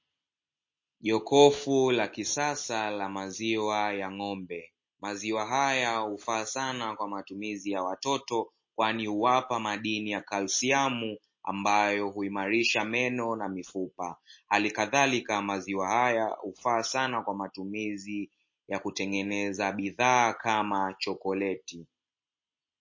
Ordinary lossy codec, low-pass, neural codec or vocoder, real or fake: MP3, 32 kbps; 7.2 kHz; none; real